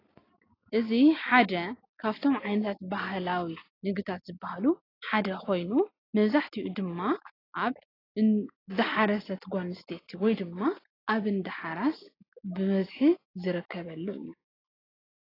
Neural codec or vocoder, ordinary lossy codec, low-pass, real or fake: none; AAC, 24 kbps; 5.4 kHz; real